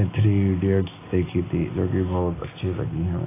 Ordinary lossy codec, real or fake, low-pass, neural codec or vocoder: AAC, 16 kbps; real; 3.6 kHz; none